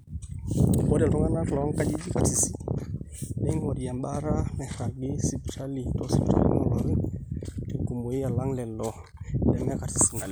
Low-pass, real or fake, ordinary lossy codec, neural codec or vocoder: none; real; none; none